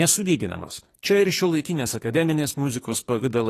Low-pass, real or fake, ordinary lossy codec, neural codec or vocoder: 14.4 kHz; fake; AAC, 48 kbps; codec, 44.1 kHz, 2.6 kbps, SNAC